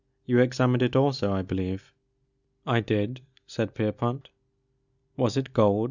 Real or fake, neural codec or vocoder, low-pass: real; none; 7.2 kHz